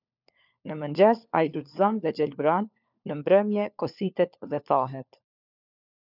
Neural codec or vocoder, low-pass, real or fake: codec, 16 kHz, 4 kbps, FunCodec, trained on LibriTTS, 50 frames a second; 5.4 kHz; fake